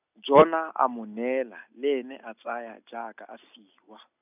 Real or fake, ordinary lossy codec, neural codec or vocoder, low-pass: real; none; none; 3.6 kHz